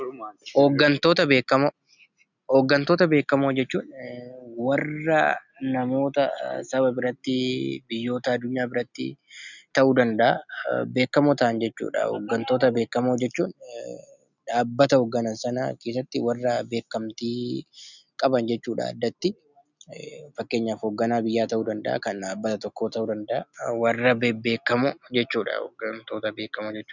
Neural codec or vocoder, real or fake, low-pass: none; real; 7.2 kHz